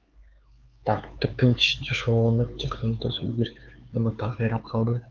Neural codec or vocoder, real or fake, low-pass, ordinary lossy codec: codec, 16 kHz, 4 kbps, X-Codec, HuBERT features, trained on LibriSpeech; fake; 7.2 kHz; Opus, 32 kbps